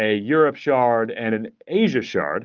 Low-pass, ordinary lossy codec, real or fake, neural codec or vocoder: 7.2 kHz; Opus, 32 kbps; real; none